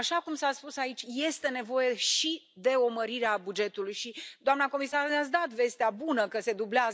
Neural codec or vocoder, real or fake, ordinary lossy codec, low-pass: none; real; none; none